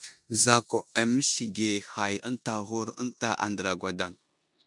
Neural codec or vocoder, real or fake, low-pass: codec, 16 kHz in and 24 kHz out, 0.9 kbps, LongCat-Audio-Codec, four codebook decoder; fake; 10.8 kHz